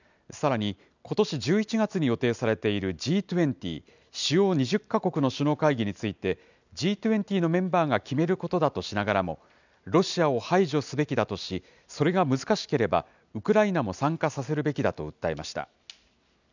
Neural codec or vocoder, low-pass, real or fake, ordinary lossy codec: none; 7.2 kHz; real; none